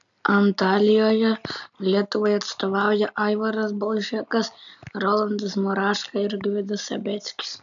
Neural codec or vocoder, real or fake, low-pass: none; real; 7.2 kHz